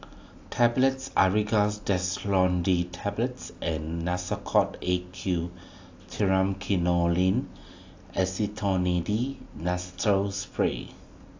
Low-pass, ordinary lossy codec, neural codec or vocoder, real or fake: 7.2 kHz; AAC, 48 kbps; none; real